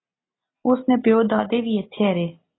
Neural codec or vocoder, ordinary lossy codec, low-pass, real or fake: none; AAC, 16 kbps; 7.2 kHz; real